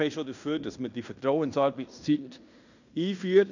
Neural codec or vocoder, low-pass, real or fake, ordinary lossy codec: codec, 16 kHz in and 24 kHz out, 0.9 kbps, LongCat-Audio-Codec, fine tuned four codebook decoder; 7.2 kHz; fake; none